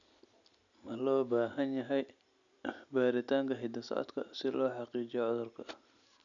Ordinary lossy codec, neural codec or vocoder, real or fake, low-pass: none; none; real; 7.2 kHz